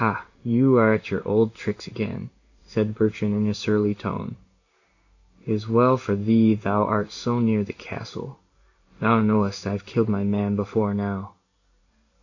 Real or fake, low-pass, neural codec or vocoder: fake; 7.2 kHz; codec, 16 kHz in and 24 kHz out, 1 kbps, XY-Tokenizer